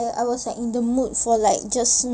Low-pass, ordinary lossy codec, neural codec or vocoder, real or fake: none; none; none; real